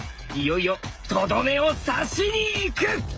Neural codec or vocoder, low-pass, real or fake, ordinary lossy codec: codec, 16 kHz, 16 kbps, FreqCodec, smaller model; none; fake; none